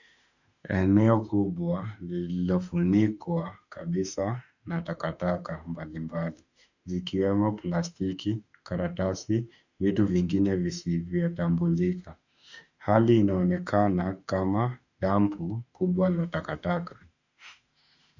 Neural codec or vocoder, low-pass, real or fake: autoencoder, 48 kHz, 32 numbers a frame, DAC-VAE, trained on Japanese speech; 7.2 kHz; fake